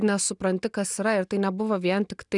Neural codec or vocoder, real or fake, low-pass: none; real; 10.8 kHz